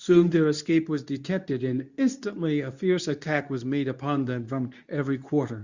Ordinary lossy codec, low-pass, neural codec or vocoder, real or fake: Opus, 64 kbps; 7.2 kHz; codec, 24 kHz, 0.9 kbps, WavTokenizer, medium speech release version 2; fake